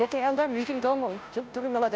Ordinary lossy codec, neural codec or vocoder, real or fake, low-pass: none; codec, 16 kHz, 0.5 kbps, FunCodec, trained on Chinese and English, 25 frames a second; fake; none